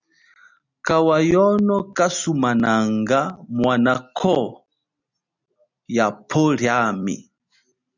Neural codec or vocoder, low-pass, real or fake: none; 7.2 kHz; real